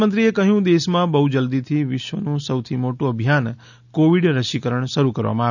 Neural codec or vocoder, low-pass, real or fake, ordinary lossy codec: none; 7.2 kHz; real; none